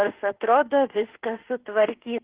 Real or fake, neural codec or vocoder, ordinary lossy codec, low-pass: fake; autoencoder, 48 kHz, 32 numbers a frame, DAC-VAE, trained on Japanese speech; Opus, 16 kbps; 3.6 kHz